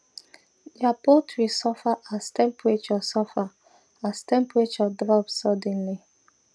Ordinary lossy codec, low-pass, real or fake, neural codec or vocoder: none; none; real; none